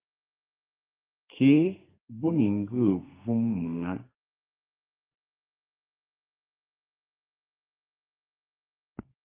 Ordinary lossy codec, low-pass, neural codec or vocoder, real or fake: Opus, 32 kbps; 3.6 kHz; codec, 32 kHz, 1.9 kbps, SNAC; fake